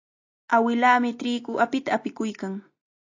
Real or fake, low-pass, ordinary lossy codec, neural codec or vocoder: real; 7.2 kHz; AAC, 48 kbps; none